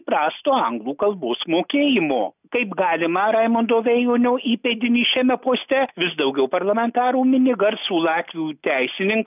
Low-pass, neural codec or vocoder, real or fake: 3.6 kHz; none; real